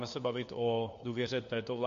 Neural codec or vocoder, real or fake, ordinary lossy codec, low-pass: codec, 16 kHz, 4 kbps, FunCodec, trained on LibriTTS, 50 frames a second; fake; MP3, 48 kbps; 7.2 kHz